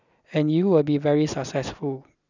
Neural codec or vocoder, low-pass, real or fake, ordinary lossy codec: none; 7.2 kHz; real; none